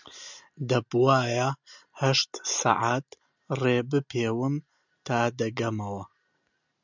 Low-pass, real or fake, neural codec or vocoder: 7.2 kHz; real; none